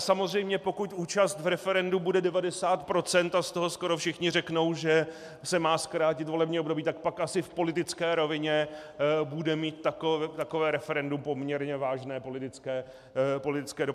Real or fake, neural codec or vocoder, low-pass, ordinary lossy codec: real; none; 14.4 kHz; MP3, 96 kbps